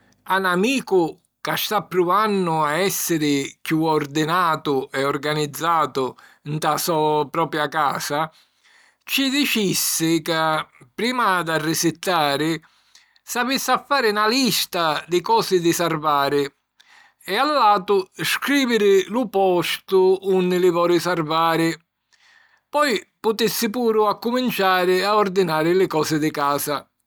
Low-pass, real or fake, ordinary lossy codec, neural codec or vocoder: none; real; none; none